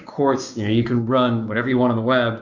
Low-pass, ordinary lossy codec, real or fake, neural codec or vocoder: 7.2 kHz; MP3, 48 kbps; fake; codec, 16 kHz, 6 kbps, DAC